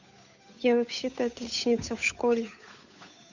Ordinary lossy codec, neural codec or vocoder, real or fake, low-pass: none; codec, 16 kHz, 8 kbps, FunCodec, trained on Chinese and English, 25 frames a second; fake; 7.2 kHz